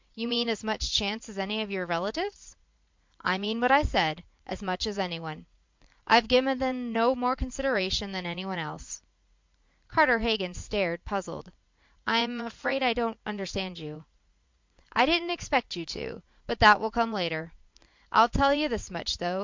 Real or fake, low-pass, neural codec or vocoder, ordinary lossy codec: fake; 7.2 kHz; vocoder, 44.1 kHz, 80 mel bands, Vocos; MP3, 64 kbps